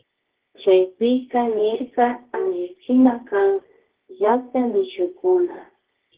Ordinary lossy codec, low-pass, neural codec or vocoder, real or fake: Opus, 16 kbps; 3.6 kHz; codec, 24 kHz, 0.9 kbps, WavTokenizer, medium music audio release; fake